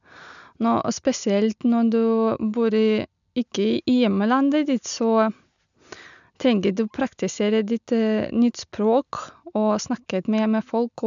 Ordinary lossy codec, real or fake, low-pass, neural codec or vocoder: none; real; 7.2 kHz; none